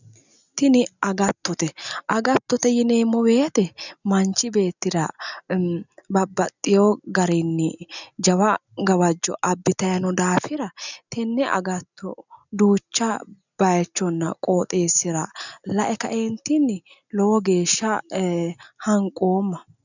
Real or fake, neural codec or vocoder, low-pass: real; none; 7.2 kHz